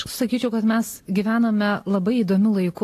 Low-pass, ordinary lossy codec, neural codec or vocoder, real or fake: 14.4 kHz; AAC, 48 kbps; none; real